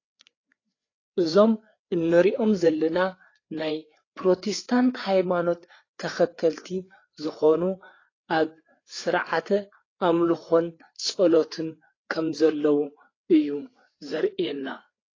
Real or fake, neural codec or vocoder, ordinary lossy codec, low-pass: fake; codec, 16 kHz, 4 kbps, FreqCodec, larger model; AAC, 32 kbps; 7.2 kHz